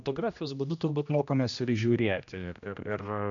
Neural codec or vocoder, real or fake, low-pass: codec, 16 kHz, 1 kbps, X-Codec, HuBERT features, trained on general audio; fake; 7.2 kHz